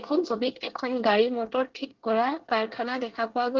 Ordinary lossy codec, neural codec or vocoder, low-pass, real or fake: Opus, 16 kbps; codec, 24 kHz, 1 kbps, SNAC; 7.2 kHz; fake